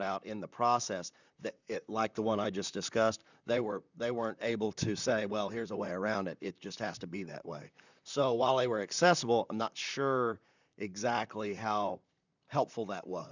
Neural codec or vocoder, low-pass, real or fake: vocoder, 44.1 kHz, 128 mel bands, Pupu-Vocoder; 7.2 kHz; fake